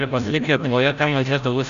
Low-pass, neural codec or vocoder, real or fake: 7.2 kHz; codec, 16 kHz, 0.5 kbps, FreqCodec, larger model; fake